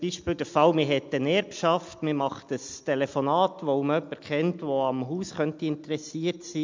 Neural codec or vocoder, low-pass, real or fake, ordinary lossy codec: none; 7.2 kHz; real; MP3, 64 kbps